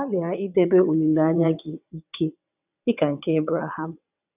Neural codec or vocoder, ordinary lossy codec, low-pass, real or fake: vocoder, 22.05 kHz, 80 mel bands, WaveNeXt; none; 3.6 kHz; fake